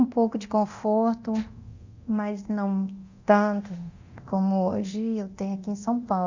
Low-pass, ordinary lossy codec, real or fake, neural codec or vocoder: 7.2 kHz; Opus, 64 kbps; fake; codec, 24 kHz, 0.9 kbps, DualCodec